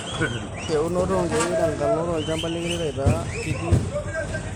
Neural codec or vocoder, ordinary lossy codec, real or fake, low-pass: none; none; real; none